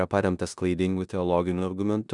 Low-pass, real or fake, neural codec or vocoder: 10.8 kHz; fake; codec, 16 kHz in and 24 kHz out, 0.9 kbps, LongCat-Audio-Codec, four codebook decoder